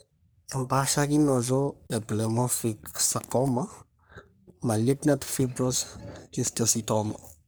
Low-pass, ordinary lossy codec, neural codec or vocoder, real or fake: none; none; codec, 44.1 kHz, 3.4 kbps, Pupu-Codec; fake